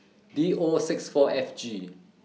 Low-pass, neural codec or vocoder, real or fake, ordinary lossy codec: none; none; real; none